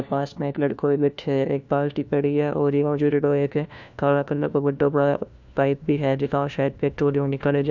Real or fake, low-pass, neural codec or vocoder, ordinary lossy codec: fake; 7.2 kHz; codec, 16 kHz, 1 kbps, FunCodec, trained on LibriTTS, 50 frames a second; none